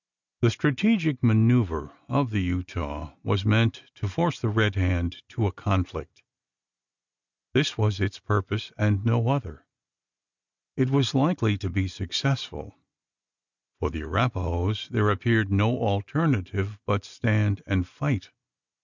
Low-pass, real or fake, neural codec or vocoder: 7.2 kHz; real; none